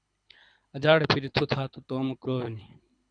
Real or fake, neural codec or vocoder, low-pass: fake; codec, 24 kHz, 6 kbps, HILCodec; 9.9 kHz